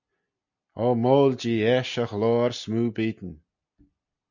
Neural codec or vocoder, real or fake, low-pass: none; real; 7.2 kHz